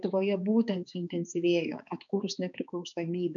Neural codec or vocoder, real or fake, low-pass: codec, 16 kHz, 4 kbps, X-Codec, HuBERT features, trained on balanced general audio; fake; 7.2 kHz